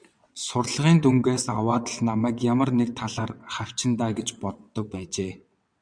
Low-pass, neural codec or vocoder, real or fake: 9.9 kHz; vocoder, 22.05 kHz, 80 mel bands, WaveNeXt; fake